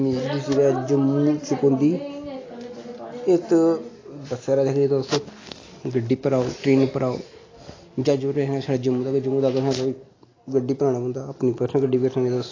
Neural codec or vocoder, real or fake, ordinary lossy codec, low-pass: none; real; AAC, 32 kbps; 7.2 kHz